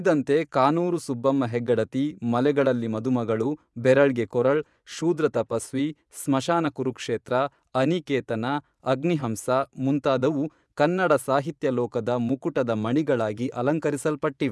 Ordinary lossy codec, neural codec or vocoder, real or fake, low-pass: none; vocoder, 24 kHz, 100 mel bands, Vocos; fake; none